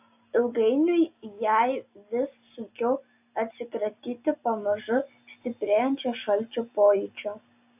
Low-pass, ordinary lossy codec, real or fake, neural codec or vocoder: 3.6 kHz; AAC, 32 kbps; real; none